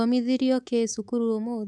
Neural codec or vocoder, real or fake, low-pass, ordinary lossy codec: codec, 24 kHz, 3.1 kbps, DualCodec; fake; none; none